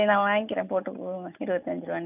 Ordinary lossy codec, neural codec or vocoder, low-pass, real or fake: none; none; 3.6 kHz; real